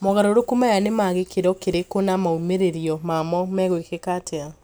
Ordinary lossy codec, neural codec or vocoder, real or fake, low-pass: none; none; real; none